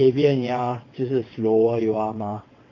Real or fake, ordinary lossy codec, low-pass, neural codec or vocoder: fake; AAC, 32 kbps; 7.2 kHz; vocoder, 22.05 kHz, 80 mel bands, WaveNeXt